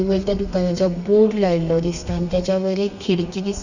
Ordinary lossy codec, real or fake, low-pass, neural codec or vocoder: none; fake; 7.2 kHz; codec, 32 kHz, 1.9 kbps, SNAC